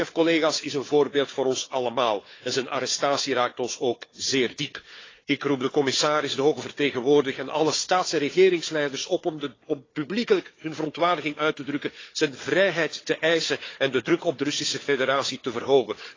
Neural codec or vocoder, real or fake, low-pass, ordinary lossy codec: codec, 16 kHz, 4 kbps, FunCodec, trained on Chinese and English, 50 frames a second; fake; 7.2 kHz; AAC, 32 kbps